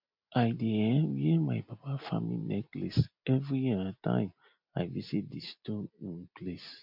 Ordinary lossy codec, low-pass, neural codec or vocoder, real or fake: MP3, 48 kbps; 5.4 kHz; none; real